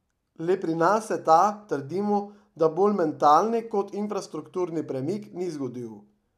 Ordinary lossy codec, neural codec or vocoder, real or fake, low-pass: none; none; real; 14.4 kHz